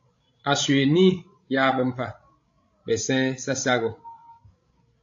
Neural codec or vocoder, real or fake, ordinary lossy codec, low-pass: codec, 16 kHz, 16 kbps, FreqCodec, larger model; fake; AAC, 48 kbps; 7.2 kHz